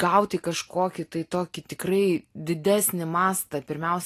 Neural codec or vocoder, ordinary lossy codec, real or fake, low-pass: none; AAC, 48 kbps; real; 14.4 kHz